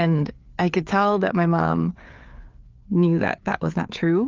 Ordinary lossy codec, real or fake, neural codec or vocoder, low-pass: Opus, 32 kbps; fake; codec, 16 kHz, 4 kbps, FreqCodec, larger model; 7.2 kHz